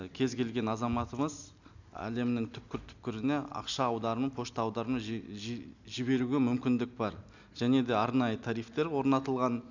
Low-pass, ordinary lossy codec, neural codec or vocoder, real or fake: 7.2 kHz; none; none; real